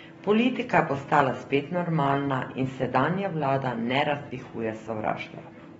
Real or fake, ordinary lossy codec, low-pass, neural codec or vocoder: real; AAC, 24 kbps; 19.8 kHz; none